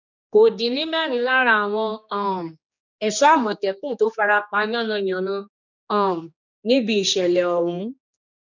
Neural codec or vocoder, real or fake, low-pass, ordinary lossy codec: codec, 16 kHz, 2 kbps, X-Codec, HuBERT features, trained on general audio; fake; 7.2 kHz; none